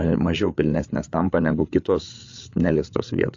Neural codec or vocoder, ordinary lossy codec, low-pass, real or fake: codec, 16 kHz, 8 kbps, FreqCodec, larger model; AAC, 48 kbps; 7.2 kHz; fake